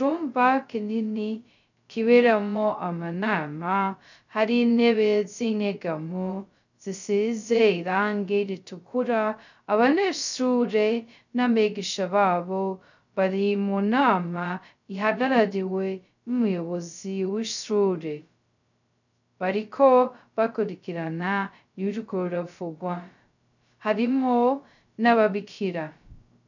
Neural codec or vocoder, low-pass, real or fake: codec, 16 kHz, 0.2 kbps, FocalCodec; 7.2 kHz; fake